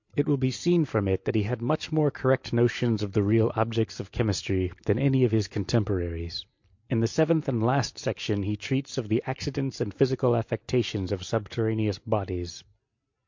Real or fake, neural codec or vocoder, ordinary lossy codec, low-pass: real; none; MP3, 48 kbps; 7.2 kHz